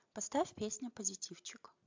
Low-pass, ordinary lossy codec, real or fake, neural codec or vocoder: 7.2 kHz; MP3, 64 kbps; real; none